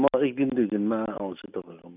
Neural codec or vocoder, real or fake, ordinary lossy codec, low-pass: none; real; none; 3.6 kHz